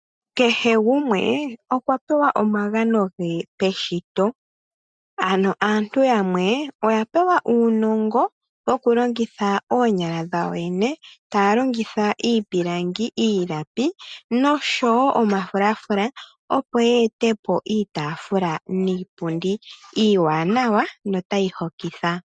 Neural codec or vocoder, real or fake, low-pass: none; real; 9.9 kHz